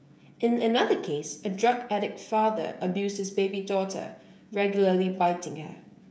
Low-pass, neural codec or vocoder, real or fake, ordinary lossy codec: none; codec, 16 kHz, 16 kbps, FreqCodec, smaller model; fake; none